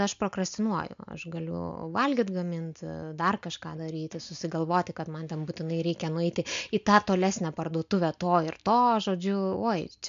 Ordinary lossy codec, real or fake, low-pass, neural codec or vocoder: MP3, 64 kbps; real; 7.2 kHz; none